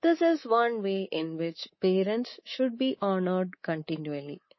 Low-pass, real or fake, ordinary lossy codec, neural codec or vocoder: 7.2 kHz; fake; MP3, 24 kbps; codec, 24 kHz, 3.1 kbps, DualCodec